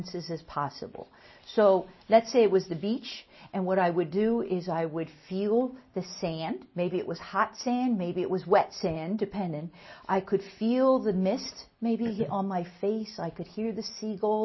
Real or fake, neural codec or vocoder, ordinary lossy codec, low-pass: real; none; MP3, 24 kbps; 7.2 kHz